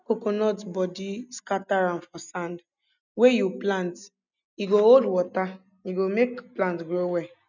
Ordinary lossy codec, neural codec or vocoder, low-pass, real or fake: none; none; 7.2 kHz; real